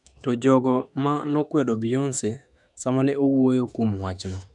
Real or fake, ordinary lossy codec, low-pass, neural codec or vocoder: fake; none; 10.8 kHz; autoencoder, 48 kHz, 32 numbers a frame, DAC-VAE, trained on Japanese speech